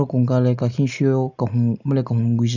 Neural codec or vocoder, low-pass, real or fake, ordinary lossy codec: none; 7.2 kHz; real; none